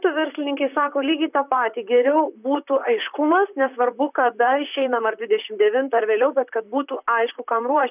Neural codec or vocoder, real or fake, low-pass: vocoder, 44.1 kHz, 128 mel bands, Pupu-Vocoder; fake; 3.6 kHz